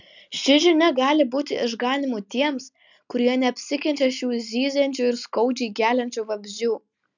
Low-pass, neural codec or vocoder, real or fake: 7.2 kHz; none; real